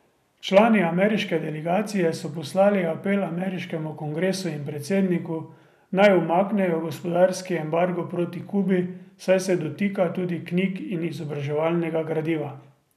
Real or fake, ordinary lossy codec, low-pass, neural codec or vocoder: real; none; 14.4 kHz; none